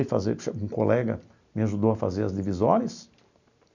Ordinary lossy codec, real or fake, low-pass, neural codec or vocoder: none; real; 7.2 kHz; none